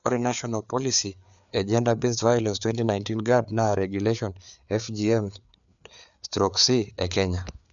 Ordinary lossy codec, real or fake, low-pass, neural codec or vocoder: none; fake; 7.2 kHz; codec, 16 kHz, 4 kbps, FreqCodec, larger model